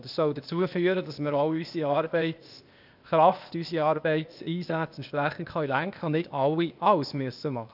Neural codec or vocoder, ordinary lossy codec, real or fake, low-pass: codec, 16 kHz, 0.8 kbps, ZipCodec; none; fake; 5.4 kHz